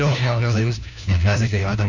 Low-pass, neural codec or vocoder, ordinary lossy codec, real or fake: 7.2 kHz; codec, 16 kHz, 1 kbps, FunCodec, trained on LibriTTS, 50 frames a second; none; fake